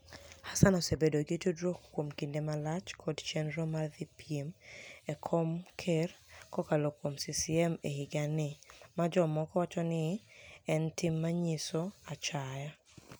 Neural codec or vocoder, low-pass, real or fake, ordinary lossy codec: none; none; real; none